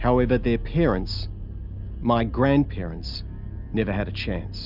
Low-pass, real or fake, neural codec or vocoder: 5.4 kHz; real; none